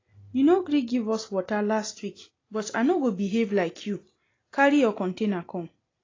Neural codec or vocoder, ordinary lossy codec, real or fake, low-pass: none; AAC, 32 kbps; real; 7.2 kHz